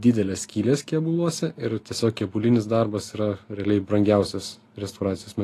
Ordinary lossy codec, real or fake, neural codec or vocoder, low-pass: AAC, 48 kbps; real; none; 14.4 kHz